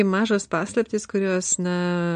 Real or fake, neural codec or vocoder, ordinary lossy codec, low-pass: real; none; MP3, 48 kbps; 9.9 kHz